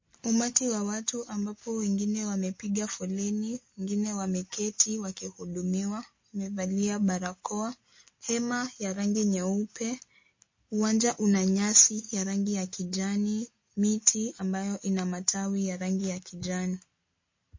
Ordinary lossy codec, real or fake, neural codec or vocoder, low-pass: MP3, 32 kbps; real; none; 7.2 kHz